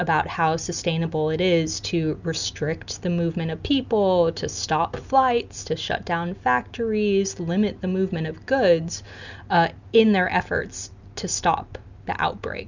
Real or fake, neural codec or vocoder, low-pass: real; none; 7.2 kHz